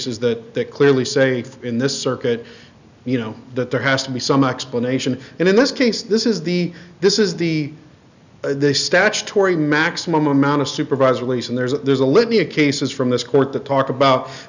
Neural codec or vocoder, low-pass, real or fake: none; 7.2 kHz; real